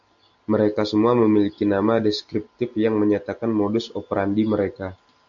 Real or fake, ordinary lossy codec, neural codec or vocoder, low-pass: real; AAC, 64 kbps; none; 7.2 kHz